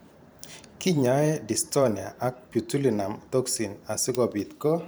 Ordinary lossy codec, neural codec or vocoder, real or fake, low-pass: none; vocoder, 44.1 kHz, 128 mel bands every 512 samples, BigVGAN v2; fake; none